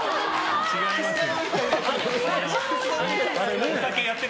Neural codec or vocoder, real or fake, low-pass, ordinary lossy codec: none; real; none; none